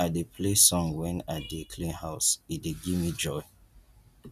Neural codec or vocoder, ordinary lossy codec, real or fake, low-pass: none; none; real; 14.4 kHz